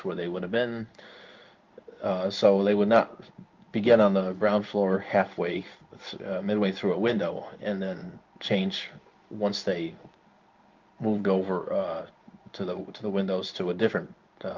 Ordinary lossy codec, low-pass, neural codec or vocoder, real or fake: Opus, 24 kbps; 7.2 kHz; codec, 16 kHz in and 24 kHz out, 1 kbps, XY-Tokenizer; fake